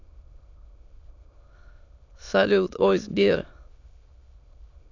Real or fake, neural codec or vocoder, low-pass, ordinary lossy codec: fake; autoencoder, 22.05 kHz, a latent of 192 numbers a frame, VITS, trained on many speakers; 7.2 kHz; AAC, 48 kbps